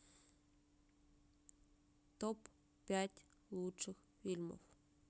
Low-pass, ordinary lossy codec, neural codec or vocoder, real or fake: none; none; none; real